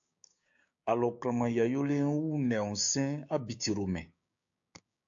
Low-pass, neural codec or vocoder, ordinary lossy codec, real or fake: 7.2 kHz; codec, 16 kHz, 6 kbps, DAC; AAC, 64 kbps; fake